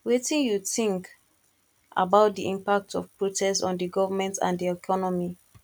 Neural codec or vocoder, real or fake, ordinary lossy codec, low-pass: none; real; none; 19.8 kHz